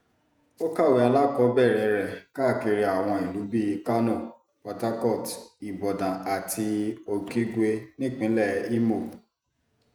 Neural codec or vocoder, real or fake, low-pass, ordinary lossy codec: vocoder, 48 kHz, 128 mel bands, Vocos; fake; none; none